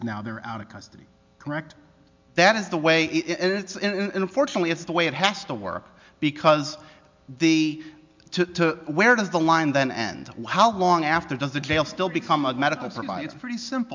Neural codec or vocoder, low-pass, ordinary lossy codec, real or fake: none; 7.2 kHz; MP3, 64 kbps; real